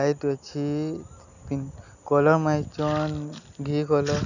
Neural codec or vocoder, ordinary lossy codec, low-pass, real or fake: none; none; 7.2 kHz; real